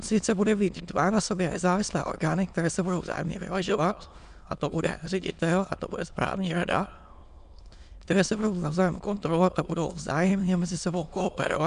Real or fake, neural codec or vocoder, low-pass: fake; autoencoder, 22.05 kHz, a latent of 192 numbers a frame, VITS, trained on many speakers; 9.9 kHz